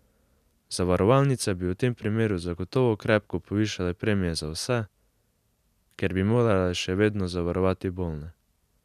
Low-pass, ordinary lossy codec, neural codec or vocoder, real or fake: 14.4 kHz; none; none; real